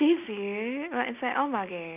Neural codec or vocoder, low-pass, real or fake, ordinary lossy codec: none; 3.6 kHz; real; none